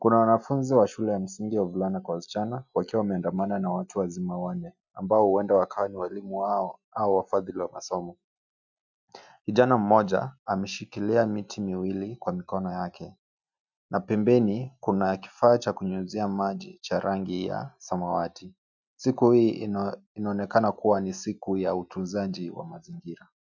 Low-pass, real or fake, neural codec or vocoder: 7.2 kHz; real; none